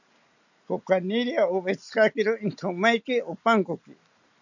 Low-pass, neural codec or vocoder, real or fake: 7.2 kHz; none; real